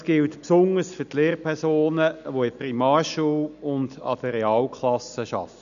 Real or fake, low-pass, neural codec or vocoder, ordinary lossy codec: real; 7.2 kHz; none; none